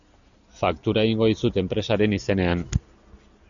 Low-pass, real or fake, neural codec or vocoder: 7.2 kHz; real; none